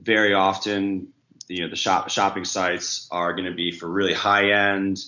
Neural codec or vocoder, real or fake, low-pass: none; real; 7.2 kHz